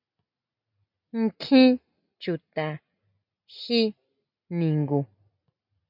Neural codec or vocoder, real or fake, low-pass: none; real; 5.4 kHz